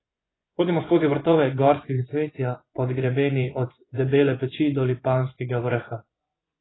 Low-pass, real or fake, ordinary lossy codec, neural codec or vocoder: 7.2 kHz; fake; AAC, 16 kbps; vocoder, 24 kHz, 100 mel bands, Vocos